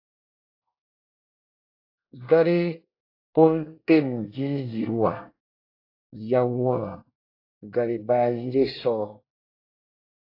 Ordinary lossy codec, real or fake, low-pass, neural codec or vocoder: AAC, 32 kbps; fake; 5.4 kHz; codec, 24 kHz, 1 kbps, SNAC